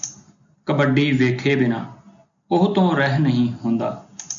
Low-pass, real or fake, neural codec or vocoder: 7.2 kHz; real; none